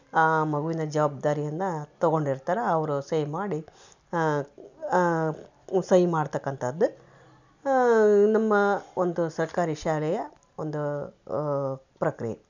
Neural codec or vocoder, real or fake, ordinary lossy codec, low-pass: none; real; none; 7.2 kHz